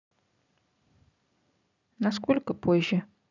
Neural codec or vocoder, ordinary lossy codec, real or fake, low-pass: none; none; real; 7.2 kHz